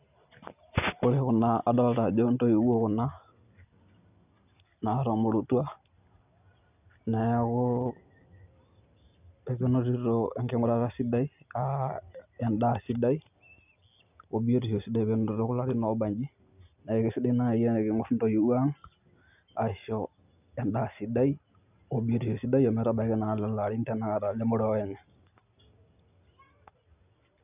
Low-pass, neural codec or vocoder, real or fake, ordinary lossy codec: 3.6 kHz; none; real; none